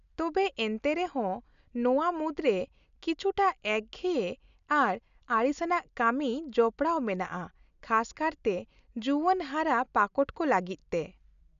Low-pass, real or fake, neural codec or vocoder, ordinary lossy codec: 7.2 kHz; real; none; none